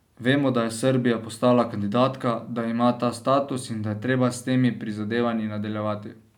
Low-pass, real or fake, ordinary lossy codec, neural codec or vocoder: 19.8 kHz; real; none; none